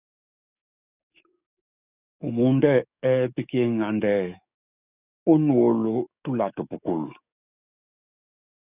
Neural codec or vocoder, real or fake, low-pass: codec, 44.1 kHz, 7.8 kbps, DAC; fake; 3.6 kHz